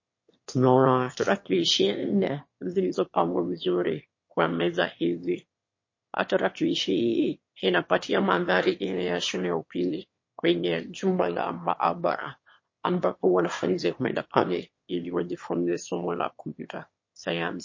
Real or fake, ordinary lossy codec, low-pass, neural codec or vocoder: fake; MP3, 32 kbps; 7.2 kHz; autoencoder, 22.05 kHz, a latent of 192 numbers a frame, VITS, trained on one speaker